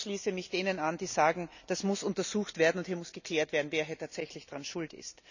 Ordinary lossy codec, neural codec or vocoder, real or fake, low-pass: none; none; real; 7.2 kHz